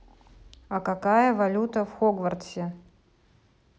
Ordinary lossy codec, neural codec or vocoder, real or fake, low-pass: none; none; real; none